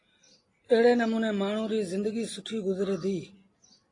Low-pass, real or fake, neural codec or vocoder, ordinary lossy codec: 10.8 kHz; real; none; AAC, 32 kbps